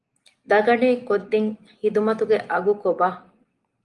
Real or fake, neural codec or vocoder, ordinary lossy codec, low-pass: real; none; Opus, 24 kbps; 10.8 kHz